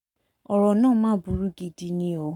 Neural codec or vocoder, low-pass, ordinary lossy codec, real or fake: codec, 44.1 kHz, 7.8 kbps, Pupu-Codec; 19.8 kHz; none; fake